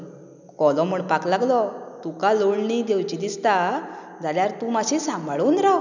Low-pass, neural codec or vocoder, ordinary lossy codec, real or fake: 7.2 kHz; none; none; real